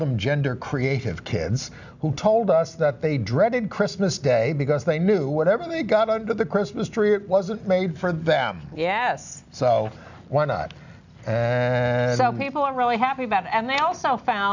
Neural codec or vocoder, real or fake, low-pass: none; real; 7.2 kHz